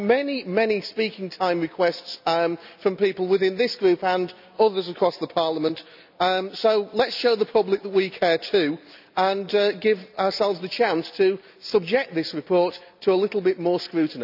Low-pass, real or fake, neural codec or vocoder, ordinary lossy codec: 5.4 kHz; real; none; none